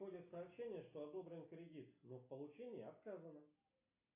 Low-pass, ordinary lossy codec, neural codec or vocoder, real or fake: 3.6 kHz; AAC, 24 kbps; none; real